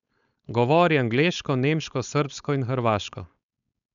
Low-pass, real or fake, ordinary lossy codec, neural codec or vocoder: 7.2 kHz; fake; none; codec, 16 kHz, 4.8 kbps, FACodec